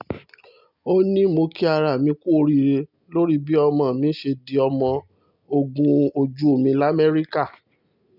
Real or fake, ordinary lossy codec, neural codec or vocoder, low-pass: real; none; none; 5.4 kHz